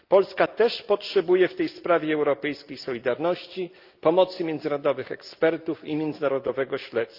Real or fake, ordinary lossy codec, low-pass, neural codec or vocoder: real; Opus, 24 kbps; 5.4 kHz; none